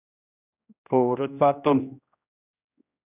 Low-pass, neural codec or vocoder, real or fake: 3.6 kHz; codec, 16 kHz, 1 kbps, X-Codec, HuBERT features, trained on general audio; fake